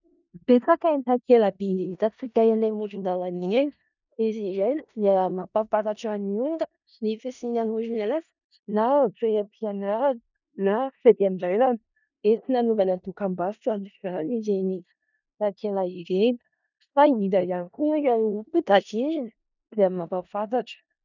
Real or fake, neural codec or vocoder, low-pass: fake; codec, 16 kHz in and 24 kHz out, 0.4 kbps, LongCat-Audio-Codec, four codebook decoder; 7.2 kHz